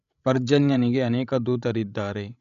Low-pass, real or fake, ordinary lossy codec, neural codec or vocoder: 7.2 kHz; fake; none; codec, 16 kHz, 16 kbps, FreqCodec, larger model